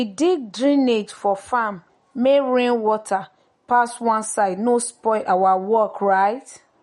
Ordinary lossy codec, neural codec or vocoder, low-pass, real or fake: MP3, 48 kbps; none; 19.8 kHz; real